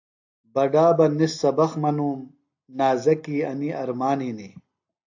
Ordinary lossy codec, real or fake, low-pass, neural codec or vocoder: AAC, 48 kbps; real; 7.2 kHz; none